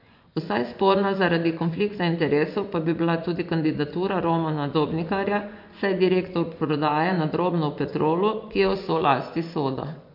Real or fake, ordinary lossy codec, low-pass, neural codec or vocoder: fake; MP3, 48 kbps; 5.4 kHz; vocoder, 24 kHz, 100 mel bands, Vocos